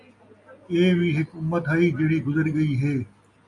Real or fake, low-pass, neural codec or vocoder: real; 10.8 kHz; none